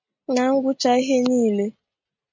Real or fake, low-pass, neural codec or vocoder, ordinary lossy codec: real; 7.2 kHz; none; MP3, 48 kbps